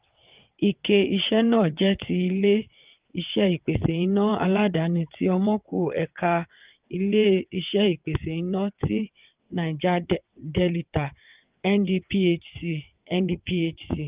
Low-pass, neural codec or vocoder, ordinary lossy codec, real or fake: 3.6 kHz; vocoder, 22.05 kHz, 80 mel bands, WaveNeXt; Opus, 32 kbps; fake